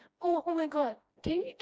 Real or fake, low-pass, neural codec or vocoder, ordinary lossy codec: fake; none; codec, 16 kHz, 1 kbps, FreqCodec, smaller model; none